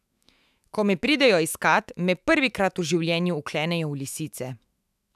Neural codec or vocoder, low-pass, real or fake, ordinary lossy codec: autoencoder, 48 kHz, 128 numbers a frame, DAC-VAE, trained on Japanese speech; 14.4 kHz; fake; none